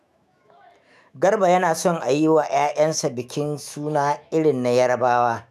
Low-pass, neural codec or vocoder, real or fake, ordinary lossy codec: 14.4 kHz; autoencoder, 48 kHz, 128 numbers a frame, DAC-VAE, trained on Japanese speech; fake; none